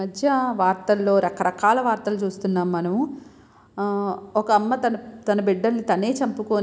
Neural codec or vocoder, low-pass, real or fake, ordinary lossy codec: none; none; real; none